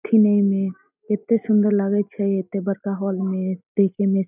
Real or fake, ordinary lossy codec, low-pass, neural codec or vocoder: real; none; 3.6 kHz; none